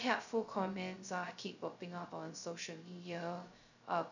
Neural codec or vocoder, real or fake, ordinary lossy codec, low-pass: codec, 16 kHz, 0.2 kbps, FocalCodec; fake; none; 7.2 kHz